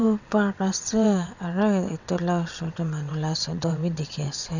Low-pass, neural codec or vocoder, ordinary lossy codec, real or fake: 7.2 kHz; vocoder, 22.05 kHz, 80 mel bands, WaveNeXt; none; fake